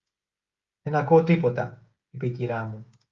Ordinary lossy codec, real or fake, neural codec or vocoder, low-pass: Opus, 32 kbps; fake; codec, 16 kHz, 16 kbps, FreqCodec, smaller model; 7.2 kHz